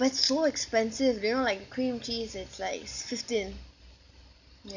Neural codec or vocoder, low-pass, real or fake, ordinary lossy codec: none; 7.2 kHz; real; none